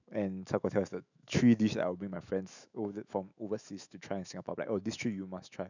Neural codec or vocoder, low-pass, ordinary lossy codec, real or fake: autoencoder, 48 kHz, 128 numbers a frame, DAC-VAE, trained on Japanese speech; 7.2 kHz; none; fake